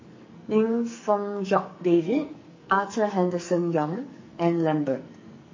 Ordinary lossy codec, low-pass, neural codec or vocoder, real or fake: MP3, 32 kbps; 7.2 kHz; codec, 44.1 kHz, 2.6 kbps, SNAC; fake